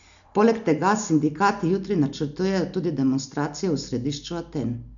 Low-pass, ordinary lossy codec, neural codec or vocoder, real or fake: 7.2 kHz; none; none; real